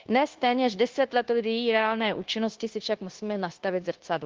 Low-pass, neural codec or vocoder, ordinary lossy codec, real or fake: 7.2 kHz; codec, 16 kHz, 0.9 kbps, LongCat-Audio-Codec; Opus, 16 kbps; fake